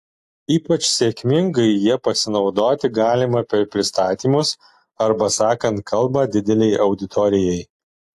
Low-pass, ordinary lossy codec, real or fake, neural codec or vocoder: 14.4 kHz; AAC, 64 kbps; real; none